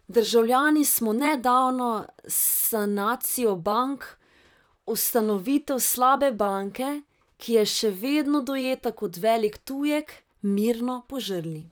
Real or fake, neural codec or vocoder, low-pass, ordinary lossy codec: fake; vocoder, 44.1 kHz, 128 mel bands, Pupu-Vocoder; none; none